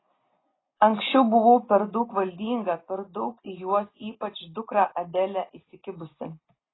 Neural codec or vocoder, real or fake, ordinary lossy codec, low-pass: none; real; AAC, 16 kbps; 7.2 kHz